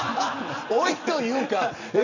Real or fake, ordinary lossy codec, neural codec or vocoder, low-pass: real; none; none; 7.2 kHz